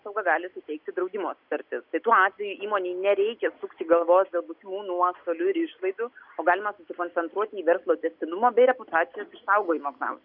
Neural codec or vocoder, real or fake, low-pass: none; real; 5.4 kHz